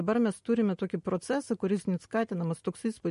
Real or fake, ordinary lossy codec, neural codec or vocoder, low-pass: real; MP3, 48 kbps; none; 10.8 kHz